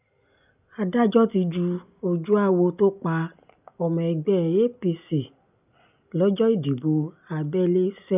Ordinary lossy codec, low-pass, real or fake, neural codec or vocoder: none; 3.6 kHz; real; none